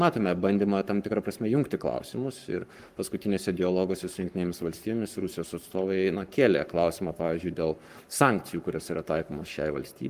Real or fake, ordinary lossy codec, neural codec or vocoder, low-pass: fake; Opus, 32 kbps; codec, 44.1 kHz, 7.8 kbps, Pupu-Codec; 14.4 kHz